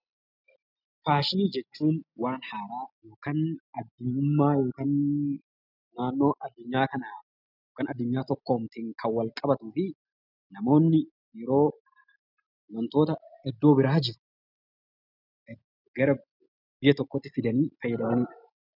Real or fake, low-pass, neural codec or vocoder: real; 5.4 kHz; none